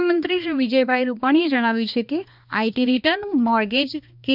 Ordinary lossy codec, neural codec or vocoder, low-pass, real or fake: none; codec, 16 kHz, 2 kbps, X-Codec, HuBERT features, trained on balanced general audio; 5.4 kHz; fake